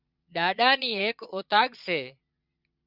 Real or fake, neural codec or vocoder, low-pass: fake; vocoder, 22.05 kHz, 80 mel bands, Vocos; 5.4 kHz